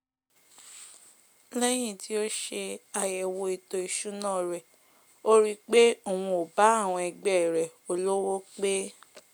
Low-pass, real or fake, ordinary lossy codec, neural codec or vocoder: none; real; none; none